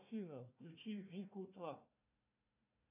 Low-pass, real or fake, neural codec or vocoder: 3.6 kHz; fake; codec, 16 kHz, 1 kbps, FunCodec, trained on Chinese and English, 50 frames a second